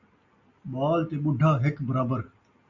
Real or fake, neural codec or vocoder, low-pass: real; none; 7.2 kHz